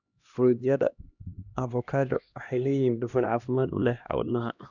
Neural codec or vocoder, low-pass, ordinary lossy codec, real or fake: codec, 16 kHz, 1 kbps, X-Codec, HuBERT features, trained on LibriSpeech; 7.2 kHz; none; fake